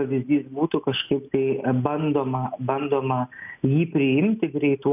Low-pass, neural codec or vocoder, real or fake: 3.6 kHz; none; real